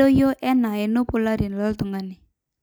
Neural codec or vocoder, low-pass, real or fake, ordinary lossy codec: none; none; real; none